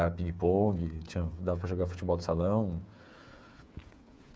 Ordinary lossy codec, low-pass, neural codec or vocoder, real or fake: none; none; codec, 16 kHz, 8 kbps, FreqCodec, smaller model; fake